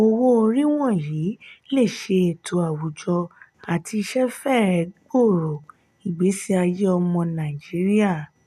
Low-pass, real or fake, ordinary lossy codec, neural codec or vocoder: 14.4 kHz; real; none; none